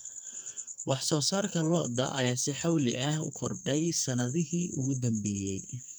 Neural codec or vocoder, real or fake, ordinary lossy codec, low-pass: codec, 44.1 kHz, 2.6 kbps, SNAC; fake; none; none